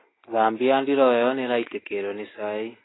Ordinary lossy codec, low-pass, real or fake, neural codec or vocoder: AAC, 16 kbps; 7.2 kHz; real; none